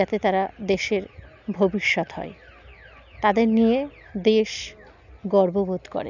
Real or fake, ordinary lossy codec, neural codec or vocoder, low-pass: real; none; none; 7.2 kHz